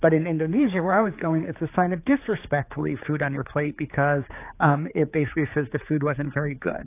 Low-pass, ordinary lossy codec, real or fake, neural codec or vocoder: 3.6 kHz; AAC, 24 kbps; fake; codec, 16 kHz, 4 kbps, X-Codec, HuBERT features, trained on general audio